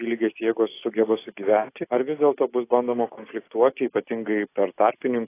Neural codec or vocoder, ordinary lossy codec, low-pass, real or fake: none; AAC, 24 kbps; 3.6 kHz; real